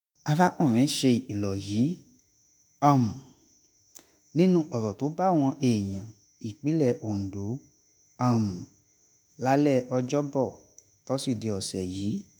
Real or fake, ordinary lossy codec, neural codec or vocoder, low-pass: fake; none; autoencoder, 48 kHz, 32 numbers a frame, DAC-VAE, trained on Japanese speech; none